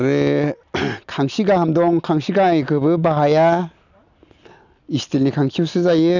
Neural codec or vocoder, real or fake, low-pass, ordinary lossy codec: none; real; 7.2 kHz; none